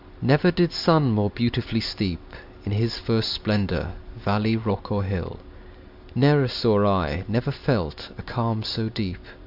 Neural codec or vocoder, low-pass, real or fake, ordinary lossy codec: none; 5.4 kHz; real; AAC, 48 kbps